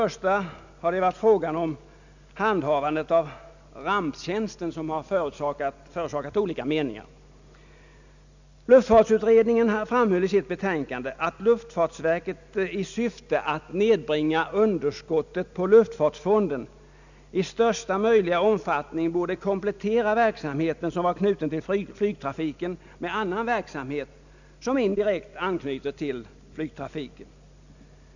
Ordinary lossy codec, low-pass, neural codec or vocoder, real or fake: none; 7.2 kHz; none; real